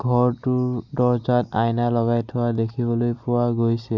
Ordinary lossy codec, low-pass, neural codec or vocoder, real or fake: none; 7.2 kHz; none; real